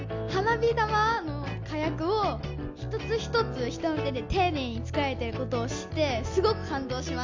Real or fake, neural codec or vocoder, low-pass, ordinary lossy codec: real; none; 7.2 kHz; MP3, 64 kbps